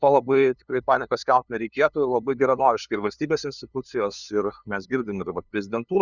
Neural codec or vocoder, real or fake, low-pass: codec, 16 kHz, 2 kbps, FunCodec, trained on LibriTTS, 25 frames a second; fake; 7.2 kHz